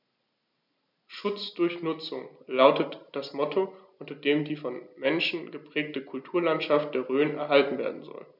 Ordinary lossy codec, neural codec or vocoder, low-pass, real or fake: none; none; 5.4 kHz; real